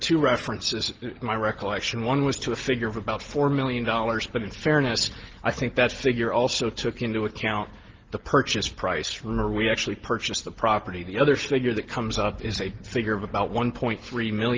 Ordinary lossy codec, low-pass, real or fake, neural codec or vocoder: Opus, 16 kbps; 7.2 kHz; real; none